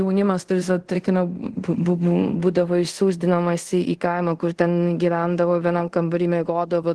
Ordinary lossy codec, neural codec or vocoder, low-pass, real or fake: Opus, 16 kbps; codec, 24 kHz, 0.5 kbps, DualCodec; 10.8 kHz; fake